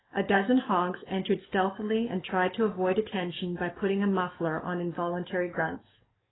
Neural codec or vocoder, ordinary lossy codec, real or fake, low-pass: codec, 44.1 kHz, 7.8 kbps, DAC; AAC, 16 kbps; fake; 7.2 kHz